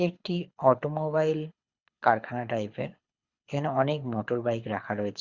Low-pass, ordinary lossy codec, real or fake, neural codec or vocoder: 7.2 kHz; Opus, 64 kbps; fake; codec, 24 kHz, 6 kbps, HILCodec